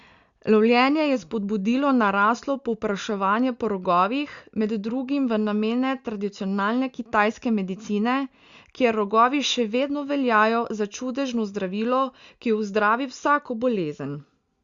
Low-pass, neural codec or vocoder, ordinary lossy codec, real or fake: 7.2 kHz; none; Opus, 64 kbps; real